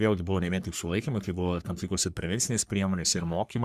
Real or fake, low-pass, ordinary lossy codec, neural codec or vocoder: fake; 14.4 kHz; Opus, 64 kbps; codec, 44.1 kHz, 3.4 kbps, Pupu-Codec